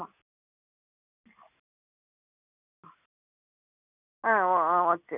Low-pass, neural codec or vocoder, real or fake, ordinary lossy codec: 3.6 kHz; none; real; none